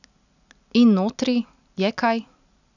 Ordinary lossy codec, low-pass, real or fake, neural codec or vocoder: none; 7.2 kHz; real; none